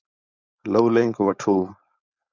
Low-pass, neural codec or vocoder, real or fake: 7.2 kHz; codec, 16 kHz, 4.8 kbps, FACodec; fake